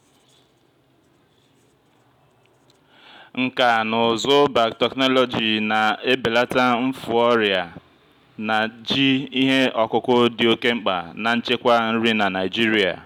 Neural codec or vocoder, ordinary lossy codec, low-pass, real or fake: none; none; 19.8 kHz; real